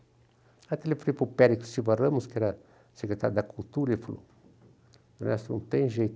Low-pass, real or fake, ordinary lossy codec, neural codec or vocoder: none; real; none; none